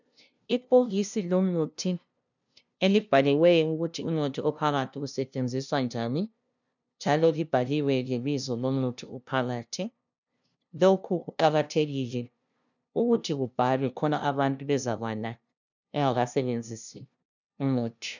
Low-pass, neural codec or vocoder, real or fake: 7.2 kHz; codec, 16 kHz, 0.5 kbps, FunCodec, trained on LibriTTS, 25 frames a second; fake